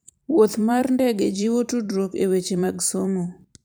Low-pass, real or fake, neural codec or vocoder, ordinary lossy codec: none; fake; vocoder, 44.1 kHz, 128 mel bands every 512 samples, BigVGAN v2; none